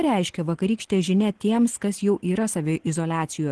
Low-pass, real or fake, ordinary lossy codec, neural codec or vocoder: 10.8 kHz; real; Opus, 16 kbps; none